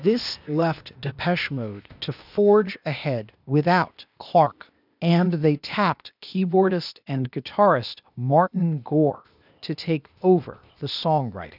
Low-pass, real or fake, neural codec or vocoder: 5.4 kHz; fake; codec, 16 kHz, 0.8 kbps, ZipCodec